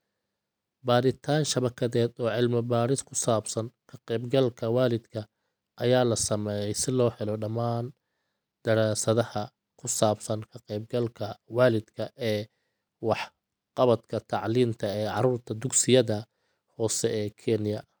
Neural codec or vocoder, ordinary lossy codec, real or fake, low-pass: none; none; real; none